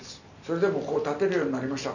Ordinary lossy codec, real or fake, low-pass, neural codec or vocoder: none; real; 7.2 kHz; none